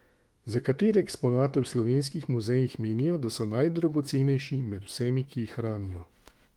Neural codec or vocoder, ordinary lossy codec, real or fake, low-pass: autoencoder, 48 kHz, 32 numbers a frame, DAC-VAE, trained on Japanese speech; Opus, 24 kbps; fake; 19.8 kHz